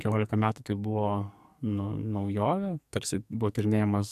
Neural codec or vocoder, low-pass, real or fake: codec, 44.1 kHz, 2.6 kbps, SNAC; 14.4 kHz; fake